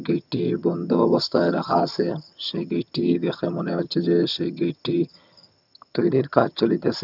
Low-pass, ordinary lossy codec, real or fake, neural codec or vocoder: 5.4 kHz; none; fake; vocoder, 22.05 kHz, 80 mel bands, HiFi-GAN